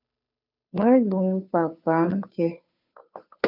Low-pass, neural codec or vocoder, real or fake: 5.4 kHz; codec, 16 kHz, 2 kbps, FunCodec, trained on Chinese and English, 25 frames a second; fake